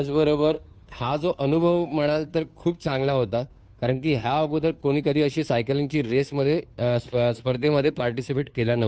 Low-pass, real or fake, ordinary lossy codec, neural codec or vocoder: none; fake; none; codec, 16 kHz, 2 kbps, FunCodec, trained on Chinese and English, 25 frames a second